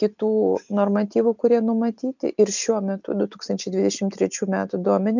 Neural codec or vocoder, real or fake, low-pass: none; real; 7.2 kHz